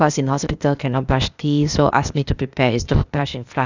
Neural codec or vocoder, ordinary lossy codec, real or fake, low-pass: codec, 16 kHz, 0.8 kbps, ZipCodec; none; fake; 7.2 kHz